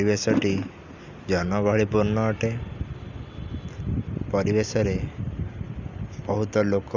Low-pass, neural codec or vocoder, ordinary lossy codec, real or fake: 7.2 kHz; none; none; real